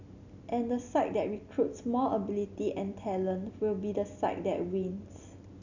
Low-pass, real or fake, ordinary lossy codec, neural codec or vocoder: 7.2 kHz; real; none; none